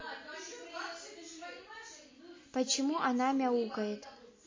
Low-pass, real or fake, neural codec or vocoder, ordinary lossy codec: 7.2 kHz; real; none; MP3, 32 kbps